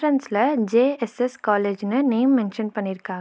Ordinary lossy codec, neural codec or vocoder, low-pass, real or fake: none; none; none; real